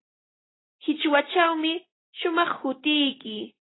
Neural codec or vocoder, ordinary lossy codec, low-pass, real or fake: none; AAC, 16 kbps; 7.2 kHz; real